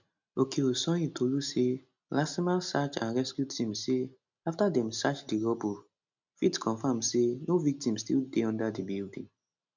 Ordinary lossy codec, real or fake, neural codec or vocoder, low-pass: none; real; none; 7.2 kHz